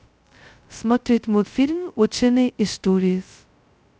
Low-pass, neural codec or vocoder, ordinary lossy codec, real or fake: none; codec, 16 kHz, 0.2 kbps, FocalCodec; none; fake